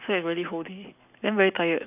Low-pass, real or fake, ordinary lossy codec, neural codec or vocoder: 3.6 kHz; real; none; none